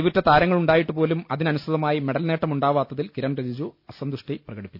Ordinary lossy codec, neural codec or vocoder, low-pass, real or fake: none; none; 5.4 kHz; real